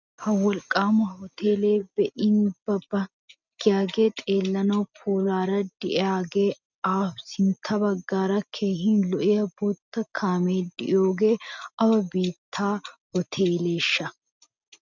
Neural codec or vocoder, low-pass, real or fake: none; 7.2 kHz; real